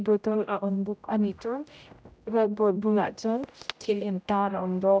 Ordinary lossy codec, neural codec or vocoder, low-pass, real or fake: none; codec, 16 kHz, 0.5 kbps, X-Codec, HuBERT features, trained on general audio; none; fake